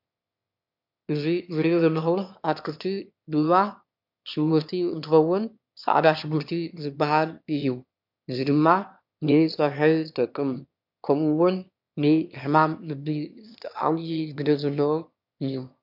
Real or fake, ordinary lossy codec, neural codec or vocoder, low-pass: fake; MP3, 48 kbps; autoencoder, 22.05 kHz, a latent of 192 numbers a frame, VITS, trained on one speaker; 5.4 kHz